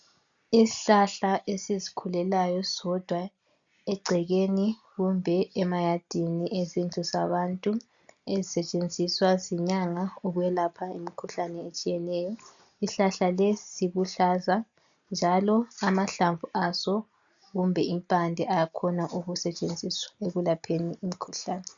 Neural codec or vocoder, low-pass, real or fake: none; 7.2 kHz; real